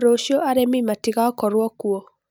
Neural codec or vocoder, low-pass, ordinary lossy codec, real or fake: none; none; none; real